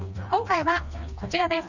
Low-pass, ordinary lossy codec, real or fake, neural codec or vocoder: 7.2 kHz; none; fake; codec, 16 kHz, 2 kbps, FreqCodec, smaller model